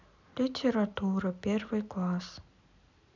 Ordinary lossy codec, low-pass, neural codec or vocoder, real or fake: none; 7.2 kHz; none; real